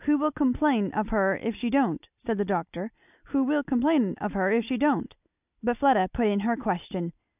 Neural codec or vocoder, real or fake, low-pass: none; real; 3.6 kHz